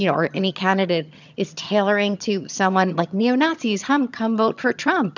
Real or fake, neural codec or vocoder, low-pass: fake; vocoder, 22.05 kHz, 80 mel bands, HiFi-GAN; 7.2 kHz